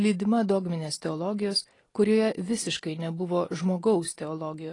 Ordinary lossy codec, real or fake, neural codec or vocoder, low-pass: AAC, 32 kbps; real; none; 10.8 kHz